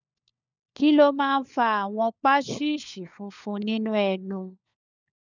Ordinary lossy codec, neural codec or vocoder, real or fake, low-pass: none; codec, 16 kHz, 4 kbps, FunCodec, trained on LibriTTS, 50 frames a second; fake; 7.2 kHz